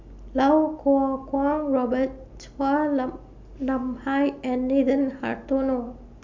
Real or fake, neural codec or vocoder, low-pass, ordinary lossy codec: real; none; 7.2 kHz; MP3, 64 kbps